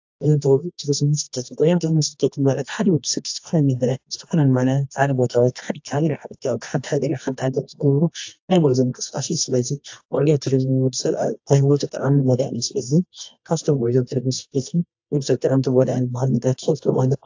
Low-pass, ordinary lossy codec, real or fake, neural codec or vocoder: 7.2 kHz; AAC, 48 kbps; fake; codec, 24 kHz, 0.9 kbps, WavTokenizer, medium music audio release